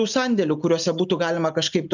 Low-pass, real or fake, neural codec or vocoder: 7.2 kHz; real; none